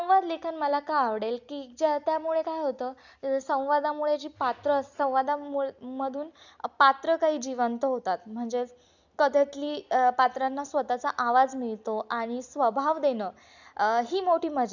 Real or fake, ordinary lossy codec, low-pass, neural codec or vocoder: real; none; 7.2 kHz; none